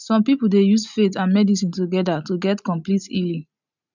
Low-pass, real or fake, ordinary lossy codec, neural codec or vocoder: 7.2 kHz; real; none; none